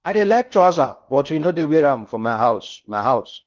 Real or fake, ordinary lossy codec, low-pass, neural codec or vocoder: fake; Opus, 24 kbps; 7.2 kHz; codec, 16 kHz in and 24 kHz out, 0.6 kbps, FocalCodec, streaming, 4096 codes